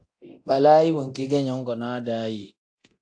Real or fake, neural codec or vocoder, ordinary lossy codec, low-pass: fake; codec, 24 kHz, 0.9 kbps, DualCodec; AAC, 48 kbps; 9.9 kHz